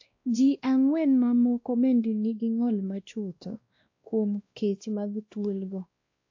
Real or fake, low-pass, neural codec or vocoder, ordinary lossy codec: fake; 7.2 kHz; codec, 16 kHz, 1 kbps, X-Codec, WavLM features, trained on Multilingual LibriSpeech; AAC, 48 kbps